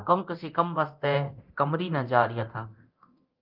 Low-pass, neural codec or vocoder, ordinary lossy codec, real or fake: 5.4 kHz; codec, 24 kHz, 0.9 kbps, DualCodec; Opus, 24 kbps; fake